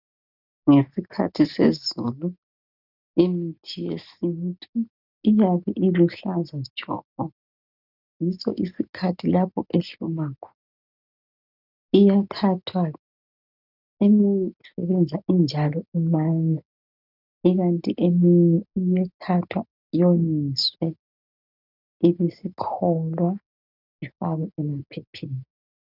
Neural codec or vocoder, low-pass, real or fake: none; 5.4 kHz; real